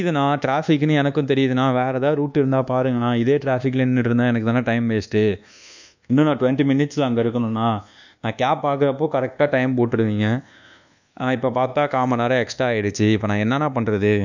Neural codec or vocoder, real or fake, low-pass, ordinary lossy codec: codec, 24 kHz, 1.2 kbps, DualCodec; fake; 7.2 kHz; none